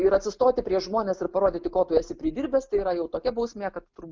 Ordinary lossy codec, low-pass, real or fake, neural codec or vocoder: Opus, 24 kbps; 7.2 kHz; real; none